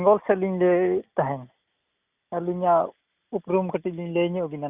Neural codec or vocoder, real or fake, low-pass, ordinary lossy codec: none; real; 3.6 kHz; Opus, 64 kbps